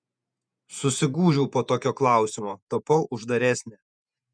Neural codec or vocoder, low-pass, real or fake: vocoder, 48 kHz, 128 mel bands, Vocos; 9.9 kHz; fake